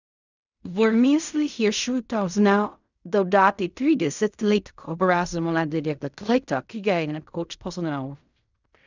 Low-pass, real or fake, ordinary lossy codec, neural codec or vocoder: 7.2 kHz; fake; none; codec, 16 kHz in and 24 kHz out, 0.4 kbps, LongCat-Audio-Codec, fine tuned four codebook decoder